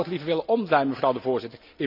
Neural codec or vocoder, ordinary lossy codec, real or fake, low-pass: none; AAC, 48 kbps; real; 5.4 kHz